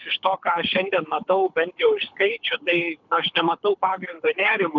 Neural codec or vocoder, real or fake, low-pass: codec, 24 kHz, 6 kbps, HILCodec; fake; 7.2 kHz